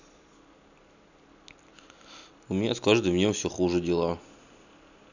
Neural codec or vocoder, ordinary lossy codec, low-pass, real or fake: none; none; 7.2 kHz; real